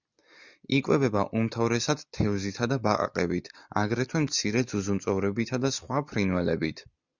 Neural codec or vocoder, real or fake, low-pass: none; real; 7.2 kHz